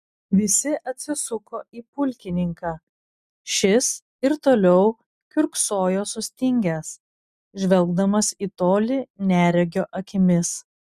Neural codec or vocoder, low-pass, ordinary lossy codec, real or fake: none; 14.4 kHz; Opus, 64 kbps; real